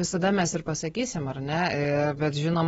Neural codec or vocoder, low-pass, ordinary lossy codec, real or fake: none; 14.4 kHz; AAC, 24 kbps; real